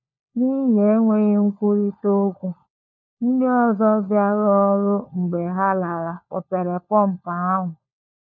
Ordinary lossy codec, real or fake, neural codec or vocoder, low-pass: none; fake; codec, 16 kHz, 4 kbps, FunCodec, trained on LibriTTS, 50 frames a second; 7.2 kHz